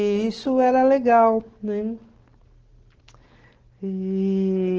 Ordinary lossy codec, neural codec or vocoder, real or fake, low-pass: Opus, 16 kbps; none; real; 7.2 kHz